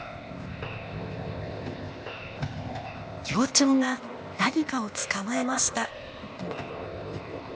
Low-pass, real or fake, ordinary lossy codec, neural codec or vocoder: none; fake; none; codec, 16 kHz, 0.8 kbps, ZipCodec